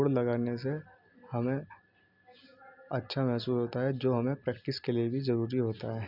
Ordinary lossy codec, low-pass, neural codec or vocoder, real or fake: none; 5.4 kHz; none; real